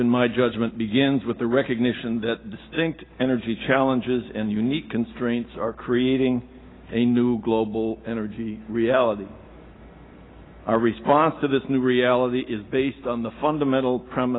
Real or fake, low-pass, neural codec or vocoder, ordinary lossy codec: real; 7.2 kHz; none; AAC, 16 kbps